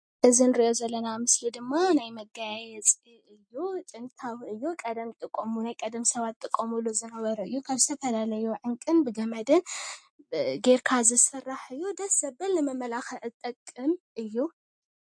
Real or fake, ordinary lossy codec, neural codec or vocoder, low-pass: real; MP3, 48 kbps; none; 9.9 kHz